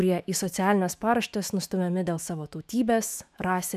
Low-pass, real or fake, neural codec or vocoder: 14.4 kHz; fake; autoencoder, 48 kHz, 128 numbers a frame, DAC-VAE, trained on Japanese speech